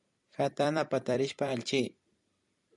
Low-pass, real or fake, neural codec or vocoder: 10.8 kHz; fake; vocoder, 44.1 kHz, 128 mel bands every 256 samples, BigVGAN v2